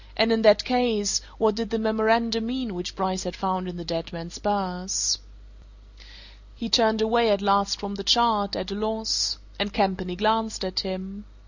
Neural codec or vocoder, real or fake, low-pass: none; real; 7.2 kHz